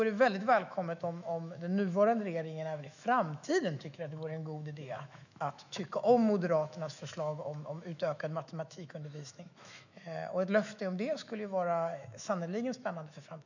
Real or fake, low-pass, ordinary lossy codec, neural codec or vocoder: fake; 7.2 kHz; none; autoencoder, 48 kHz, 128 numbers a frame, DAC-VAE, trained on Japanese speech